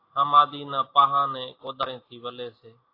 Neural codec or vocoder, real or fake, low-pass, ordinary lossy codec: none; real; 5.4 kHz; AAC, 32 kbps